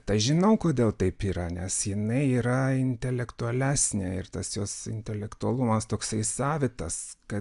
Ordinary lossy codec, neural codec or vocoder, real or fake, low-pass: AAC, 96 kbps; none; real; 10.8 kHz